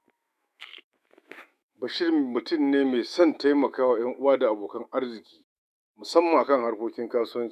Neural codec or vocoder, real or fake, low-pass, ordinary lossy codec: autoencoder, 48 kHz, 128 numbers a frame, DAC-VAE, trained on Japanese speech; fake; 14.4 kHz; none